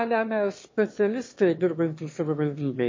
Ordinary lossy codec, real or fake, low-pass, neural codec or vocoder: MP3, 32 kbps; fake; 7.2 kHz; autoencoder, 22.05 kHz, a latent of 192 numbers a frame, VITS, trained on one speaker